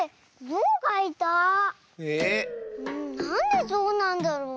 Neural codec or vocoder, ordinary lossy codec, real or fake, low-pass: none; none; real; none